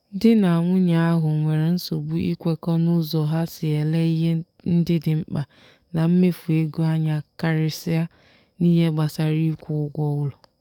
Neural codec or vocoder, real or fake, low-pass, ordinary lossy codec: codec, 44.1 kHz, 7.8 kbps, DAC; fake; 19.8 kHz; none